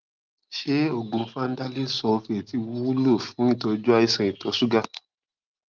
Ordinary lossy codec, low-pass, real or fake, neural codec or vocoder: Opus, 32 kbps; 7.2 kHz; real; none